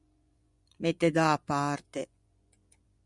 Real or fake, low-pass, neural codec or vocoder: fake; 10.8 kHz; vocoder, 24 kHz, 100 mel bands, Vocos